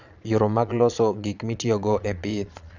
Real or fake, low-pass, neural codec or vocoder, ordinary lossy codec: fake; 7.2 kHz; vocoder, 22.05 kHz, 80 mel bands, Vocos; none